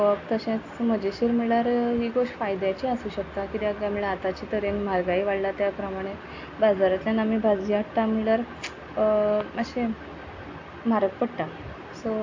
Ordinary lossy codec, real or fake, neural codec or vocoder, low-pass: AAC, 48 kbps; real; none; 7.2 kHz